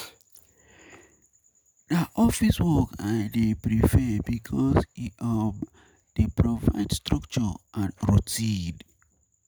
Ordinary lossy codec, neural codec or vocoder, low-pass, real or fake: none; none; none; real